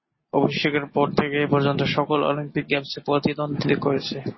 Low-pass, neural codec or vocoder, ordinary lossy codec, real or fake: 7.2 kHz; none; MP3, 24 kbps; real